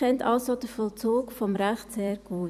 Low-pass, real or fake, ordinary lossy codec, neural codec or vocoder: 14.4 kHz; fake; none; vocoder, 44.1 kHz, 128 mel bands every 256 samples, BigVGAN v2